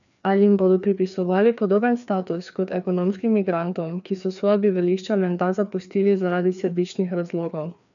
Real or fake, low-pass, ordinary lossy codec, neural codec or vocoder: fake; 7.2 kHz; none; codec, 16 kHz, 2 kbps, FreqCodec, larger model